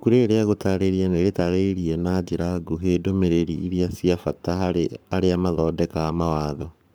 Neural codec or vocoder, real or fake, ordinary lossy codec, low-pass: codec, 44.1 kHz, 7.8 kbps, Pupu-Codec; fake; none; none